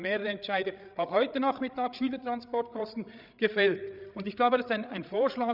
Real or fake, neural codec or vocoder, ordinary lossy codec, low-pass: fake; codec, 16 kHz, 8 kbps, FreqCodec, larger model; none; 5.4 kHz